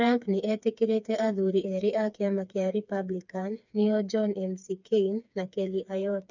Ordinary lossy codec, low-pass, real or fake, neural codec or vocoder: none; 7.2 kHz; fake; codec, 16 kHz, 4 kbps, FreqCodec, smaller model